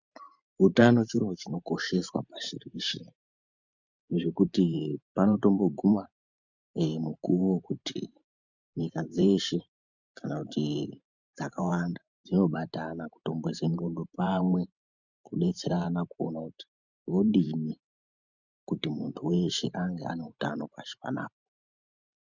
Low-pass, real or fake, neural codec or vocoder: 7.2 kHz; fake; vocoder, 44.1 kHz, 128 mel bands every 512 samples, BigVGAN v2